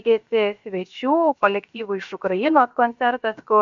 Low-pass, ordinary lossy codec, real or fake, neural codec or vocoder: 7.2 kHz; MP3, 64 kbps; fake; codec, 16 kHz, about 1 kbps, DyCAST, with the encoder's durations